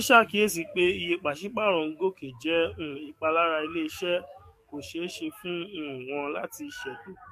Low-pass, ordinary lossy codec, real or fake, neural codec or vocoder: 14.4 kHz; MP3, 64 kbps; fake; codec, 44.1 kHz, 7.8 kbps, DAC